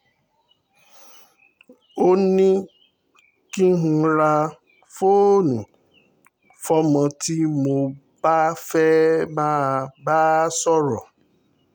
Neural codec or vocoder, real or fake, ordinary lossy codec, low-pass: none; real; none; 19.8 kHz